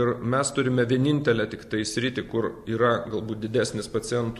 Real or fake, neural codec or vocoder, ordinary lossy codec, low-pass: real; none; MP3, 64 kbps; 14.4 kHz